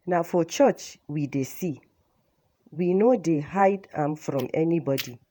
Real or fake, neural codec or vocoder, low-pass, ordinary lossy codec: fake; vocoder, 48 kHz, 128 mel bands, Vocos; none; none